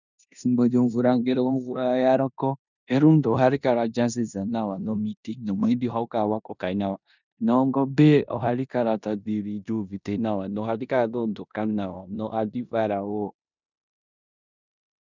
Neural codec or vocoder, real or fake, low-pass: codec, 16 kHz in and 24 kHz out, 0.9 kbps, LongCat-Audio-Codec, four codebook decoder; fake; 7.2 kHz